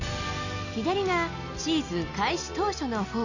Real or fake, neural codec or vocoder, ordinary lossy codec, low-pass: real; none; none; 7.2 kHz